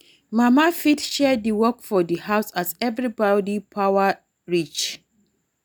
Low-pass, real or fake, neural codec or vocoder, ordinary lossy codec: none; real; none; none